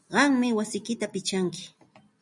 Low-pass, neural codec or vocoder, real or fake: 10.8 kHz; none; real